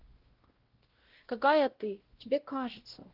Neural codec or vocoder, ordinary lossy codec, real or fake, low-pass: codec, 16 kHz, 0.5 kbps, X-Codec, WavLM features, trained on Multilingual LibriSpeech; Opus, 16 kbps; fake; 5.4 kHz